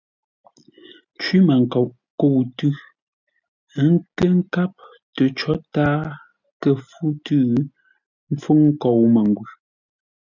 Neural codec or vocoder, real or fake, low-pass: none; real; 7.2 kHz